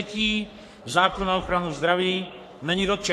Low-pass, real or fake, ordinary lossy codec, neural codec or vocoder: 14.4 kHz; fake; AAC, 64 kbps; codec, 44.1 kHz, 3.4 kbps, Pupu-Codec